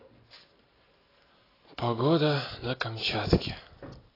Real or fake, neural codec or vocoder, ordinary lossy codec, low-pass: real; none; AAC, 24 kbps; 5.4 kHz